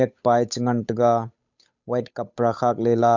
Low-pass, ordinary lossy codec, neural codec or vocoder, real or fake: 7.2 kHz; none; codec, 16 kHz, 8 kbps, FunCodec, trained on Chinese and English, 25 frames a second; fake